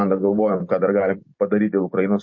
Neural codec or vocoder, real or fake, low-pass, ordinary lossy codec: none; real; 7.2 kHz; MP3, 32 kbps